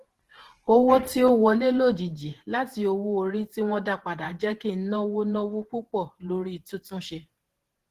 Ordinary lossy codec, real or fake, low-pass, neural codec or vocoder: Opus, 16 kbps; fake; 14.4 kHz; vocoder, 48 kHz, 128 mel bands, Vocos